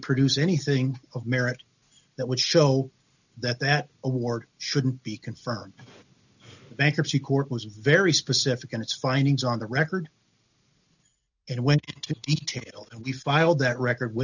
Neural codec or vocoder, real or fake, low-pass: none; real; 7.2 kHz